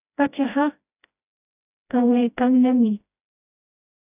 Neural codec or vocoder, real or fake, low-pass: codec, 16 kHz, 1 kbps, FreqCodec, smaller model; fake; 3.6 kHz